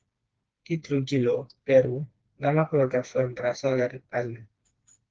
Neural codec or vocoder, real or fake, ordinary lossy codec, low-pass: codec, 16 kHz, 2 kbps, FreqCodec, smaller model; fake; Opus, 16 kbps; 7.2 kHz